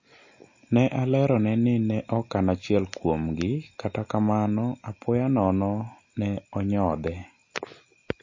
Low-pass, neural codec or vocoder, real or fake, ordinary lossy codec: 7.2 kHz; none; real; MP3, 32 kbps